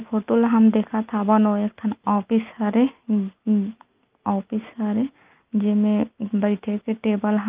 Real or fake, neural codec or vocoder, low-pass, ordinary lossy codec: real; none; 3.6 kHz; Opus, 24 kbps